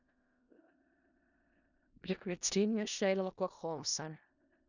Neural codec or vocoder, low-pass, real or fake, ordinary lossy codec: codec, 16 kHz in and 24 kHz out, 0.4 kbps, LongCat-Audio-Codec, four codebook decoder; 7.2 kHz; fake; none